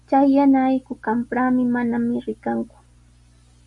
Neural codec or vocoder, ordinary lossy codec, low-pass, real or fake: none; AAC, 64 kbps; 10.8 kHz; real